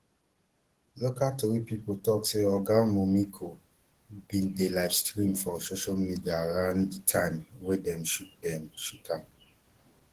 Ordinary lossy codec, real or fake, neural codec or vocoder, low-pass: Opus, 16 kbps; fake; codec, 44.1 kHz, 7.8 kbps, DAC; 14.4 kHz